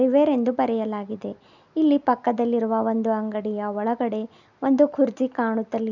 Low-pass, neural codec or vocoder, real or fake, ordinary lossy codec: 7.2 kHz; none; real; none